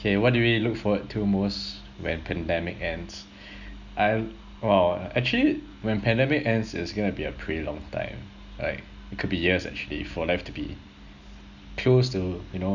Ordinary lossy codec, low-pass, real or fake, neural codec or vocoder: none; 7.2 kHz; real; none